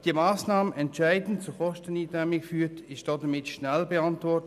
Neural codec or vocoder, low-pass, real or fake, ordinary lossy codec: none; 14.4 kHz; real; none